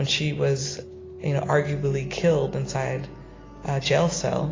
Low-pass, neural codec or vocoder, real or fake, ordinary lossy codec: 7.2 kHz; none; real; AAC, 32 kbps